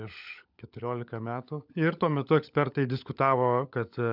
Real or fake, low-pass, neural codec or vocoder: fake; 5.4 kHz; codec, 16 kHz, 16 kbps, FunCodec, trained on LibriTTS, 50 frames a second